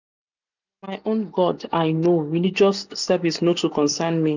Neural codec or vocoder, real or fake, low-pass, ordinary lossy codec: none; real; 7.2 kHz; none